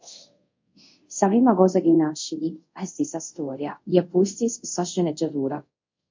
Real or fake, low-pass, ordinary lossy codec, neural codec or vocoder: fake; 7.2 kHz; MP3, 48 kbps; codec, 24 kHz, 0.5 kbps, DualCodec